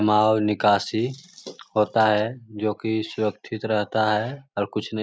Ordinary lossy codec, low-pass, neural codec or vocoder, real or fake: none; none; none; real